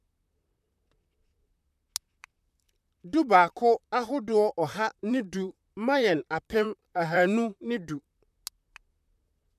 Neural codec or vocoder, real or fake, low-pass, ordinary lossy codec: vocoder, 44.1 kHz, 128 mel bands, Pupu-Vocoder; fake; 14.4 kHz; none